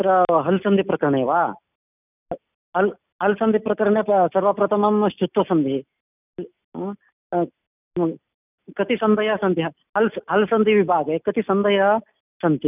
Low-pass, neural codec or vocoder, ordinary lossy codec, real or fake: 3.6 kHz; none; none; real